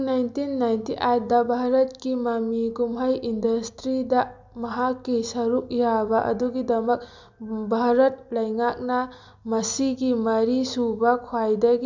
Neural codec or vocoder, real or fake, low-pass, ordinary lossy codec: none; real; 7.2 kHz; MP3, 64 kbps